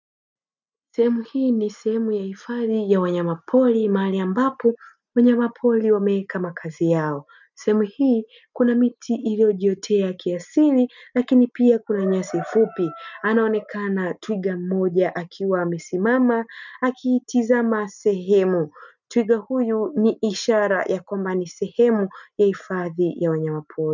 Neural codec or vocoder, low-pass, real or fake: none; 7.2 kHz; real